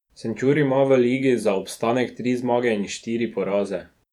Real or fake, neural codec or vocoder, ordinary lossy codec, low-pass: fake; vocoder, 48 kHz, 128 mel bands, Vocos; none; 19.8 kHz